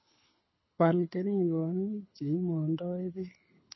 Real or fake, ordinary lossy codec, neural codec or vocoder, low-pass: fake; MP3, 24 kbps; codec, 24 kHz, 6 kbps, HILCodec; 7.2 kHz